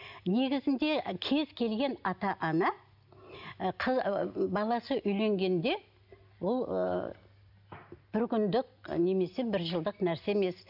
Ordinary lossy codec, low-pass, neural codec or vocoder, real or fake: none; 5.4 kHz; none; real